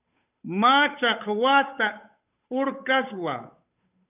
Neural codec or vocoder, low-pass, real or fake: codec, 16 kHz, 8 kbps, FunCodec, trained on Chinese and English, 25 frames a second; 3.6 kHz; fake